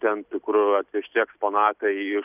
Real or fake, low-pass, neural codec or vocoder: real; 3.6 kHz; none